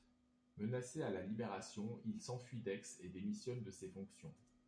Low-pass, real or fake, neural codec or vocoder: 9.9 kHz; real; none